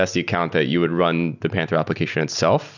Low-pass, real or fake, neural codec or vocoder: 7.2 kHz; real; none